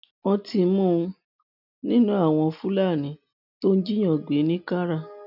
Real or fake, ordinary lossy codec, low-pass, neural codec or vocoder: real; none; 5.4 kHz; none